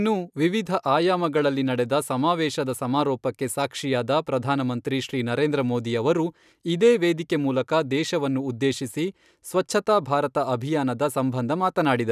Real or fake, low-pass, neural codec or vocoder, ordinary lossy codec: real; 14.4 kHz; none; none